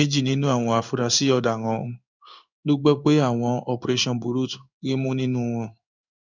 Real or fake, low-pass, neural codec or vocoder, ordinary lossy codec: fake; 7.2 kHz; codec, 16 kHz in and 24 kHz out, 1 kbps, XY-Tokenizer; none